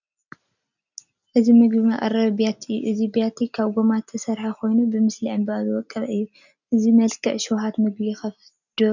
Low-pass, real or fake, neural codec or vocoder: 7.2 kHz; real; none